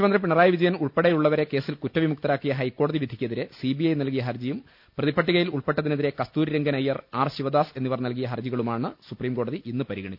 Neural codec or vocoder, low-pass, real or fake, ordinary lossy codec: none; 5.4 kHz; real; none